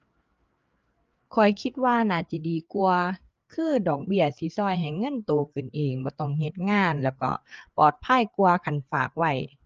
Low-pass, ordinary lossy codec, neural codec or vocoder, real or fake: 7.2 kHz; Opus, 24 kbps; codec, 16 kHz, 4 kbps, FreqCodec, larger model; fake